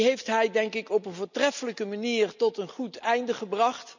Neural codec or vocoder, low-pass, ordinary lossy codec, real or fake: none; 7.2 kHz; none; real